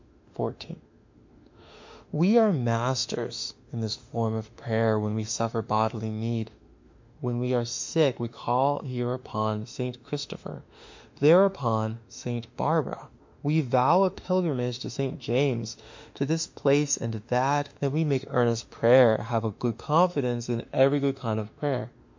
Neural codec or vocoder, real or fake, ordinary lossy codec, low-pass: autoencoder, 48 kHz, 32 numbers a frame, DAC-VAE, trained on Japanese speech; fake; MP3, 48 kbps; 7.2 kHz